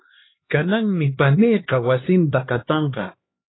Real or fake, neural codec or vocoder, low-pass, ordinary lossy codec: fake; codec, 16 kHz, 2 kbps, X-Codec, HuBERT features, trained on LibriSpeech; 7.2 kHz; AAC, 16 kbps